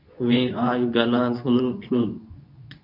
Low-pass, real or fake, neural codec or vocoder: 5.4 kHz; fake; codec, 24 kHz, 0.9 kbps, WavTokenizer, medium speech release version 2